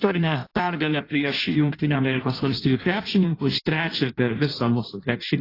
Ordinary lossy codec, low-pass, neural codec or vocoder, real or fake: AAC, 24 kbps; 5.4 kHz; codec, 16 kHz in and 24 kHz out, 0.6 kbps, FireRedTTS-2 codec; fake